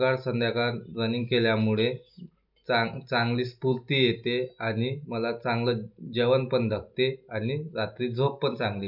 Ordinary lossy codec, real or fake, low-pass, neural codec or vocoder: none; real; 5.4 kHz; none